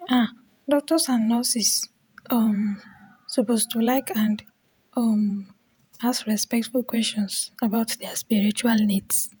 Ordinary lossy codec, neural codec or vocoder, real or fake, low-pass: none; vocoder, 48 kHz, 128 mel bands, Vocos; fake; none